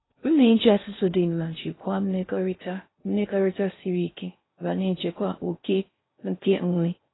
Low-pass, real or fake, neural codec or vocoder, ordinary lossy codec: 7.2 kHz; fake; codec, 16 kHz in and 24 kHz out, 0.6 kbps, FocalCodec, streaming, 4096 codes; AAC, 16 kbps